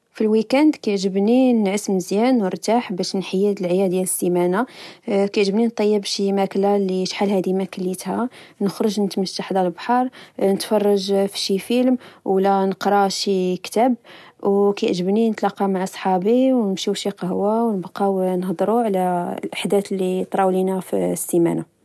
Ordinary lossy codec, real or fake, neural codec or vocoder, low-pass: none; real; none; none